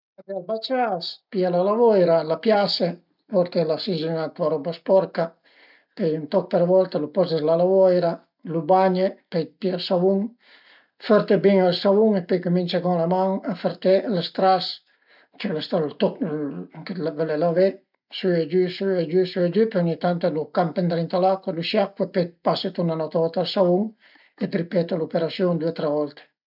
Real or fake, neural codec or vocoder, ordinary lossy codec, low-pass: real; none; none; 5.4 kHz